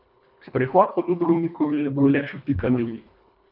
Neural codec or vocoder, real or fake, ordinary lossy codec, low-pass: codec, 24 kHz, 1.5 kbps, HILCodec; fake; none; 5.4 kHz